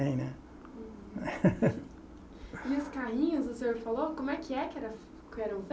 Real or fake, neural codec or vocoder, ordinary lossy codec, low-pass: real; none; none; none